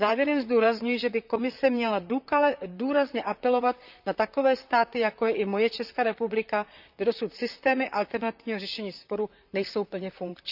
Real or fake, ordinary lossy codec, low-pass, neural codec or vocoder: fake; none; 5.4 kHz; vocoder, 44.1 kHz, 128 mel bands, Pupu-Vocoder